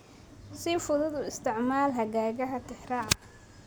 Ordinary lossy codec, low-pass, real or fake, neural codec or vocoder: none; none; real; none